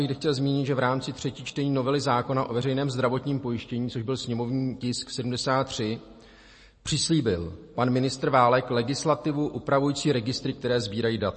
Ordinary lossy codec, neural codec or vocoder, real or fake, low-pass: MP3, 32 kbps; none; real; 10.8 kHz